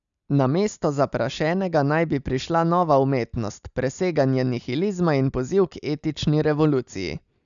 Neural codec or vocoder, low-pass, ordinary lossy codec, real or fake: none; 7.2 kHz; none; real